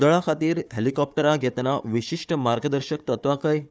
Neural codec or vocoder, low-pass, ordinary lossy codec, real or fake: codec, 16 kHz, 4 kbps, FunCodec, trained on Chinese and English, 50 frames a second; none; none; fake